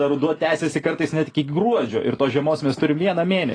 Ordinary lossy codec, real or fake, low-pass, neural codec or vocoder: AAC, 32 kbps; real; 9.9 kHz; none